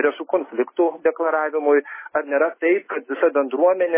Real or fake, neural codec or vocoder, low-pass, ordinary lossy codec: real; none; 3.6 kHz; MP3, 16 kbps